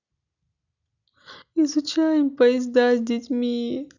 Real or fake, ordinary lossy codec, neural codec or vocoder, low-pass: real; none; none; 7.2 kHz